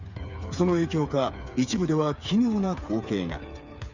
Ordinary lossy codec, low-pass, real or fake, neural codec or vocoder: Opus, 64 kbps; 7.2 kHz; fake; codec, 16 kHz, 8 kbps, FreqCodec, smaller model